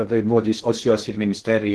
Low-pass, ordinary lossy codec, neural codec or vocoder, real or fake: 10.8 kHz; Opus, 16 kbps; codec, 16 kHz in and 24 kHz out, 0.6 kbps, FocalCodec, streaming, 2048 codes; fake